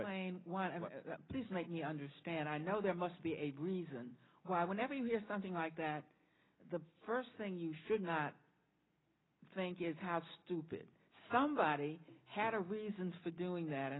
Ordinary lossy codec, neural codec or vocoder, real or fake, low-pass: AAC, 16 kbps; none; real; 7.2 kHz